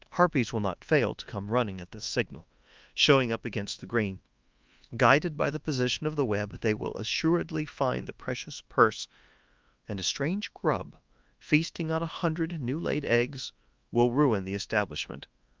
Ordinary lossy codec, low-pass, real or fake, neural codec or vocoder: Opus, 24 kbps; 7.2 kHz; fake; codec, 24 kHz, 1.2 kbps, DualCodec